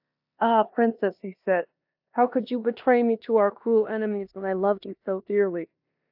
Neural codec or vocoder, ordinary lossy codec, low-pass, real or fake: codec, 16 kHz in and 24 kHz out, 0.9 kbps, LongCat-Audio-Codec, four codebook decoder; AAC, 48 kbps; 5.4 kHz; fake